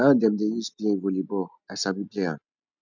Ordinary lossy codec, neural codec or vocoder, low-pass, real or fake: none; none; 7.2 kHz; real